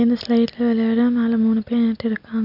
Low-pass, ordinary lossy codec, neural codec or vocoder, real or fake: 5.4 kHz; none; none; real